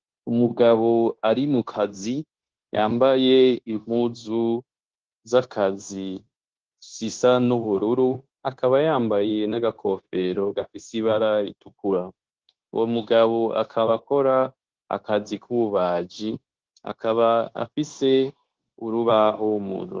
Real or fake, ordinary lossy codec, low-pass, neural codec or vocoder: fake; Opus, 16 kbps; 7.2 kHz; codec, 16 kHz, 0.9 kbps, LongCat-Audio-Codec